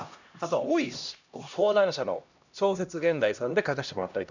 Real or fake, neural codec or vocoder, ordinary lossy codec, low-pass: fake; codec, 16 kHz, 1 kbps, X-Codec, HuBERT features, trained on LibriSpeech; none; 7.2 kHz